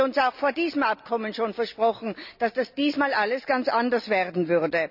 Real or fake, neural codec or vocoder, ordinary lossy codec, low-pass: real; none; none; 5.4 kHz